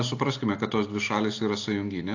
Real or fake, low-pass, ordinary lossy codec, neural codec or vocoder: real; 7.2 kHz; AAC, 48 kbps; none